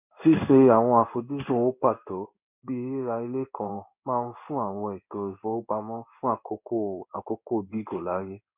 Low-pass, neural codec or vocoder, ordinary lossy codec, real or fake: 3.6 kHz; codec, 16 kHz in and 24 kHz out, 1 kbps, XY-Tokenizer; none; fake